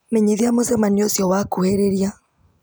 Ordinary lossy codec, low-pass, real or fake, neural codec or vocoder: none; none; real; none